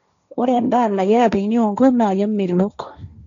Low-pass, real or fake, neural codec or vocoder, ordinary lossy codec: 7.2 kHz; fake; codec, 16 kHz, 1.1 kbps, Voila-Tokenizer; none